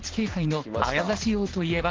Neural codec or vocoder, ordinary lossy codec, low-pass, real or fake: vocoder, 44.1 kHz, 128 mel bands every 512 samples, BigVGAN v2; Opus, 16 kbps; 7.2 kHz; fake